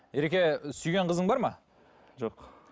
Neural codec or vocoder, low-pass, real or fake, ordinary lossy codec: none; none; real; none